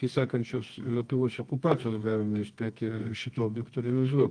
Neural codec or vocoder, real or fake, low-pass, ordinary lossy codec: codec, 24 kHz, 0.9 kbps, WavTokenizer, medium music audio release; fake; 9.9 kHz; Opus, 32 kbps